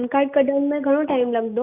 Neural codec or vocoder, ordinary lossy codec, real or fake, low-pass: none; none; real; 3.6 kHz